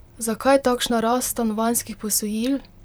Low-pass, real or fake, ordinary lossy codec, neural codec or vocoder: none; fake; none; vocoder, 44.1 kHz, 128 mel bands, Pupu-Vocoder